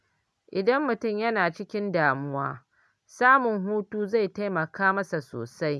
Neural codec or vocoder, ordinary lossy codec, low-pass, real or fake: none; none; none; real